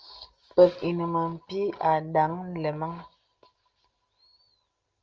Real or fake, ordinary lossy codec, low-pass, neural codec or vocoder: real; Opus, 24 kbps; 7.2 kHz; none